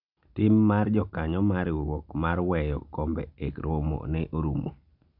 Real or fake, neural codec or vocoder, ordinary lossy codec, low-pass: real; none; none; 5.4 kHz